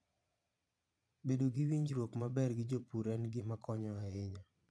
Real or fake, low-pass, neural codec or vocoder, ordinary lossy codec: fake; none; vocoder, 22.05 kHz, 80 mel bands, Vocos; none